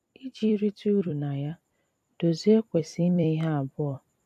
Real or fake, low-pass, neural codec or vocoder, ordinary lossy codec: fake; 14.4 kHz; vocoder, 44.1 kHz, 128 mel bands every 256 samples, BigVGAN v2; AAC, 96 kbps